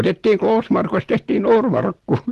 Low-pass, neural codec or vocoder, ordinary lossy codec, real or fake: 14.4 kHz; none; Opus, 16 kbps; real